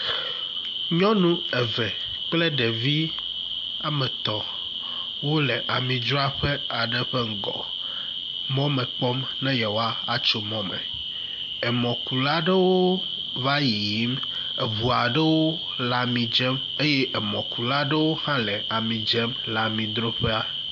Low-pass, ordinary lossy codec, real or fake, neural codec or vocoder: 7.2 kHz; AAC, 64 kbps; real; none